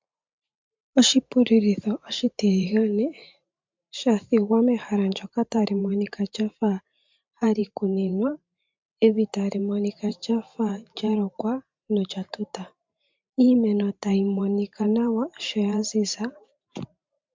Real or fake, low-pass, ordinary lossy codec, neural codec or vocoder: fake; 7.2 kHz; MP3, 64 kbps; vocoder, 44.1 kHz, 128 mel bands every 512 samples, BigVGAN v2